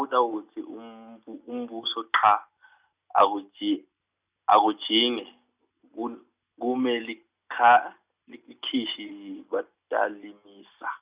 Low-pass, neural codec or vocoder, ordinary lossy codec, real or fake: 3.6 kHz; none; Opus, 64 kbps; real